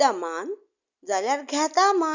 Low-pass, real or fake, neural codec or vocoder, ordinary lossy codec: 7.2 kHz; real; none; none